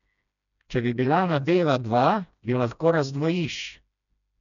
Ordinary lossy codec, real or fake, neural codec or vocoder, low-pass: none; fake; codec, 16 kHz, 1 kbps, FreqCodec, smaller model; 7.2 kHz